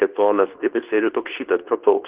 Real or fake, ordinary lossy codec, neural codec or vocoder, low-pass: fake; Opus, 16 kbps; codec, 24 kHz, 0.9 kbps, WavTokenizer, medium speech release version 2; 3.6 kHz